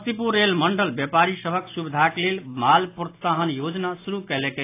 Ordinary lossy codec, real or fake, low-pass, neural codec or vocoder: AAC, 24 kbps; real; 3.6 kHz; none